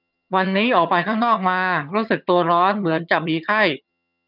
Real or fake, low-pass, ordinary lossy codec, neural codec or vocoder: fake; 5.4 kHz; none; vocoder, 22.05 kHz, 80 mel bands, HiFi-GAN